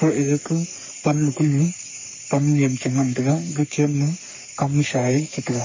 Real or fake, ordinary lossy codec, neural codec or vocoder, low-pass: fake; MP3, 32 kbps; codec, 44.1 kHz, 3.4 kbps, Pupu-Codec; 7.2 kHz